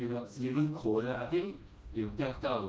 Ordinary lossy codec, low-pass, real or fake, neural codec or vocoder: none; none; fake; codec, 16 kHz, 1 kbps, FreqCodec, smaller model